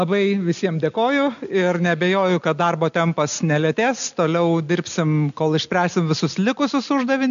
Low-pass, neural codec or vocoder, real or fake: 7.2 kHz; none; real